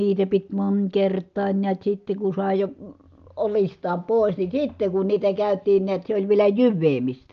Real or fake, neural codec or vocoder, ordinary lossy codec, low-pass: real; none; Opus, 24 kbps; 7.2 kHz